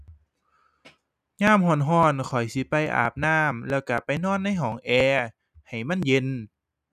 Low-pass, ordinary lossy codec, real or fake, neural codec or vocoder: 14.4 kHz; none; real; none